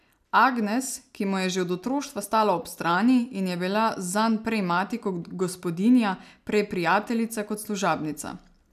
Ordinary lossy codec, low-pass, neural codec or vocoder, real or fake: none; 14.4 kHz; none; real